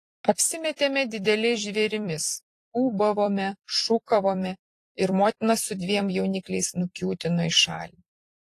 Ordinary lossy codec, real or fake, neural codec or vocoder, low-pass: AAC, 48 kbps; fake; vocoder, 44.1 kHz, 128 mel bands every 256 samples, BigVGAN v2; 14.4 kHz